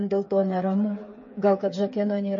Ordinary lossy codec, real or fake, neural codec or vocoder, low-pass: MP3, 32 kbps; fake; codec, 16 kHz, 8 kbps, FreqCodec, smaller model; 7.2 kHz